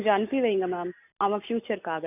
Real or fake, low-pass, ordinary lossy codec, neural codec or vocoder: real; 3.6 kHz; none; none